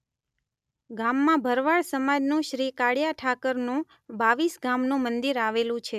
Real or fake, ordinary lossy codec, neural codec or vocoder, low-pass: real; none; none; 14.4 kHz